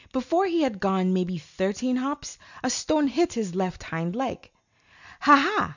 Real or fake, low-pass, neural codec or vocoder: real; 7.2 kHz; none